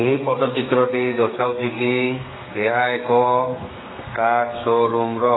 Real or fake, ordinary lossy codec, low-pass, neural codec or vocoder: fake; AAC, 16 kbps; 7.2 kHz; codec, 32 kHz, 1.9 kbps, SNAC